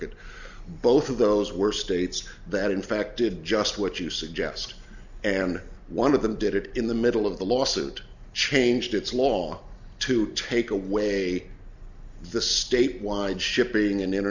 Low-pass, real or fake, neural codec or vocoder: 7.2 kHz; real; none